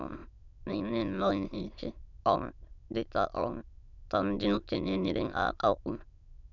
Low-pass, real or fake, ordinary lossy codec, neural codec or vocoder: 7.2 kHz; fake; none; autoencoder, 22.05 kHz, a latent of 192 numbers a frame, VITS, trained on many speakers